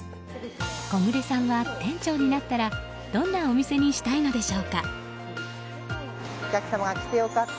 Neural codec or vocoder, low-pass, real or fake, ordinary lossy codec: none; none; real; none